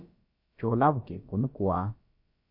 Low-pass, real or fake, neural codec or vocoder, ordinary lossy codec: 5.4 kHz; fake; codec, 16 kHz, about 1 kbps, DyCAST, with the encoder's durations; MP3, 32 kbps